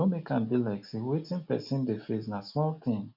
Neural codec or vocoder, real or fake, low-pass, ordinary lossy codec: none; real; 5.4 kHz; none